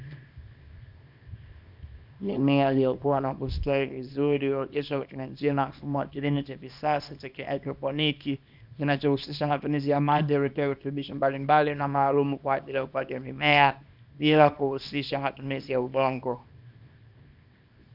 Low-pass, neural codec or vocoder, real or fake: 5.4 kHz; codec, 24 kHz, 0.9 kbps, WavTokenizer, small release; fake